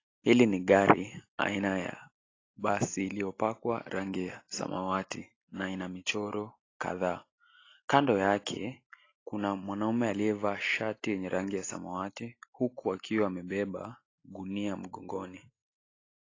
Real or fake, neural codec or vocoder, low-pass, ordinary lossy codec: real; none; 7.2 kHz; AAC, 32 kbps